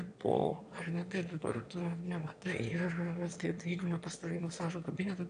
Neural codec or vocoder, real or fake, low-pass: autoencoder, 22.05 kHz, a latent of 192 numbers a frame, VITS, trained on one speaker; fake; 9.9 kHz